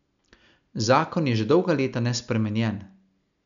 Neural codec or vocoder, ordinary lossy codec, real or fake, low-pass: none; none; real; 7.2 kHz